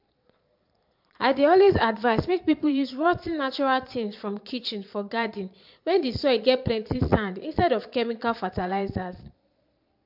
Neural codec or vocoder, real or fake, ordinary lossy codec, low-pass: vocoder, 22.05 kHz, 80 mel bands, Vocos; fake; MP3, 48 kbps; 5.4 kHz